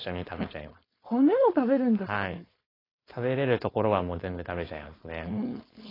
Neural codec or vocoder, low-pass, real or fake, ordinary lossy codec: codec, 16 kHz, 4.8 kbps, FACodec; 5.4 kHz; fake; AAC, 24 kbps